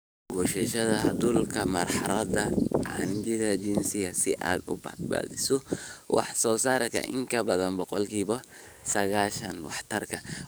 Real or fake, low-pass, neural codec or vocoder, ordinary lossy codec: fake; none; codec, 44.1 kHz, 7.8 kbps, DAC; none